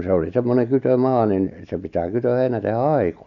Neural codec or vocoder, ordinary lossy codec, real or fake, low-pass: none; none; real; 7.2 kHz